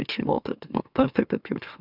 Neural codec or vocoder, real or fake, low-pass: autoencoder, 44.1 kHz, a latent of 192 numbers a frame, MeloTTS; fake; 5.4 kHz